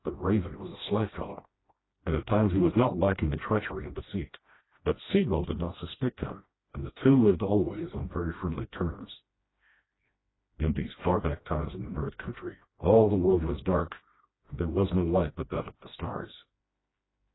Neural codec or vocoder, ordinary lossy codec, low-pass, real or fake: codec, 16 kHz, 1 kbps, FreqCodec, smaller model; AAC, 16 kbps; 7.2 kHz; fake